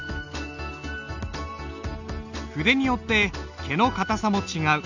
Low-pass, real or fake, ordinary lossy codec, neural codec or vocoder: 7.2 kHz; real; none; none